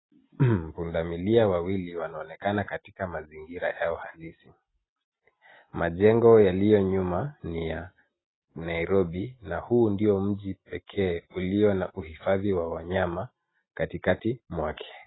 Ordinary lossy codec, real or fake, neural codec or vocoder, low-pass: AAC, 16 kbps; real; none; 7.2 kHz